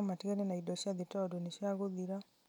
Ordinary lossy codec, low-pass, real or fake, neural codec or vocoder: none; 19.8 kHz; real; none